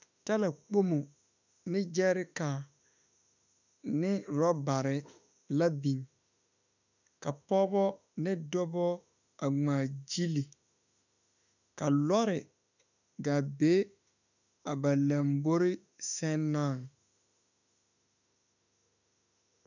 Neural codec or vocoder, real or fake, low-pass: autoencoder, 48 kHz, 32 numbers a frame, DAC-VAE, trained on Japanese speech; fake; 7.2 kHz